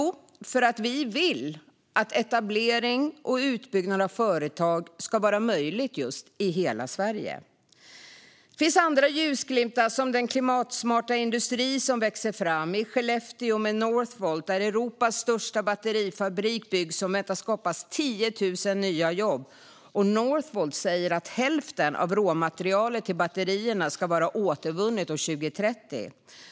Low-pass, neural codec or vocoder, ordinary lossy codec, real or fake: none; none; none; real